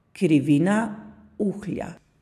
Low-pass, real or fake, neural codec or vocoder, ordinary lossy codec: 14.4 kHz; real; none; AAC, 96 kbps